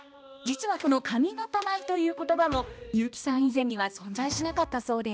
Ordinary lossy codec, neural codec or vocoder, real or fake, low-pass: none; codec, 16 kHz, 1 kbps, X-Codec, HuBERT features, trained on balanced general audio; fake; none